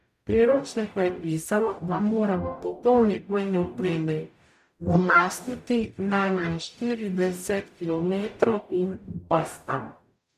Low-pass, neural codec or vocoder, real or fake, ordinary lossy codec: 14.4 kHz; codec, 44.1 kHz, 0.9 kbps, DAC; fake; MP3, 96 kbps